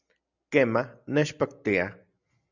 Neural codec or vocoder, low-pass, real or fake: none; 7.2 kHz; real